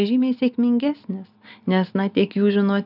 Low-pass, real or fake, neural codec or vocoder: 5.4 kHz; real; none